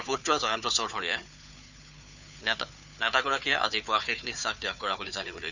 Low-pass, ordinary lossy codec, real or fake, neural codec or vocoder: 7.2 kHz; none; fake; codec, 16 kHz, 4 kbps, FunCodec, trained on Chinese and English, 50 frames a second